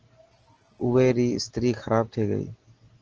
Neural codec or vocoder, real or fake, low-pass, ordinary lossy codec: none; real; 7.2 kHz; Opus, 16 kbps